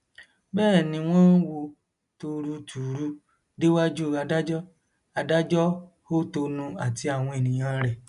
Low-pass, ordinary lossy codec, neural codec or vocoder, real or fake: 10.8 kHz; none; none; real